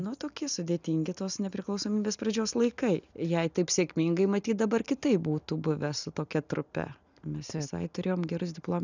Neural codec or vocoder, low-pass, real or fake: none; 7.2 kHz; real